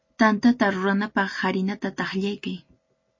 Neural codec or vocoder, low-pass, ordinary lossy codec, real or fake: none; 7.2 kHz; MP3, 32 kbps; real